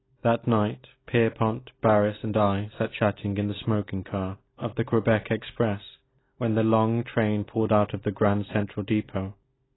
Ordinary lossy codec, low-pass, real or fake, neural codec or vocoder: AAC, 16 kbps; 7.2 kHz; real; none